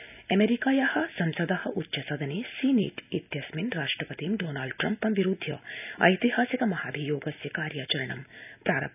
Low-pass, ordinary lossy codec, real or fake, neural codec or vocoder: 3.6 kHz; none; real; none